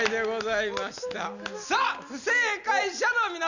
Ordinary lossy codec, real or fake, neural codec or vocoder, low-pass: none; real; none; 7.2 kHz